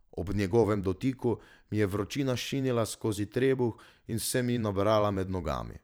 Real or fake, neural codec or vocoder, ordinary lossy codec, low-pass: fake; vocoder, 44.1 kHz, 128 mel bands every 512 samples, BigVGAN v2; none; none